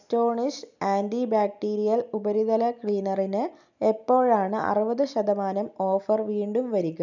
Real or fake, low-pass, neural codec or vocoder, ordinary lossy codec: real; 7.2 kHz; none; none